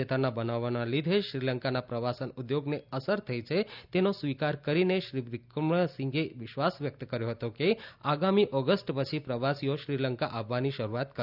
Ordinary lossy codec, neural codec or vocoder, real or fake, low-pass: none; none; real; 5.4 kHz